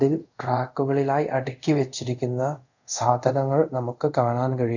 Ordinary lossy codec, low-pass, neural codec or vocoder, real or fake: none; 7.2 kHz; codec, 24 kHz, 0.5 kbps, DualCodec; fake